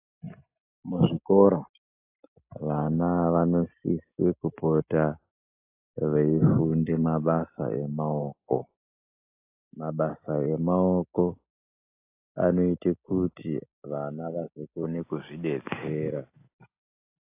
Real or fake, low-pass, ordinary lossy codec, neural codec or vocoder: real; 3.6 kHz; AAC, 32 kbps; none